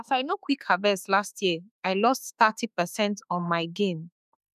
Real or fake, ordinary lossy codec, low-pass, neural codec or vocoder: fake; none; 14.4 kHz; autoencoder, 48 kHz, 32 numbers a frame, DAC-VAE, trained on Japanese speech